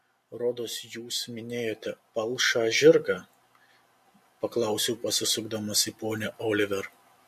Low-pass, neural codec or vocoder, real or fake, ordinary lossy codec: 14.4 kHz; none; real; MP3, 64 kbps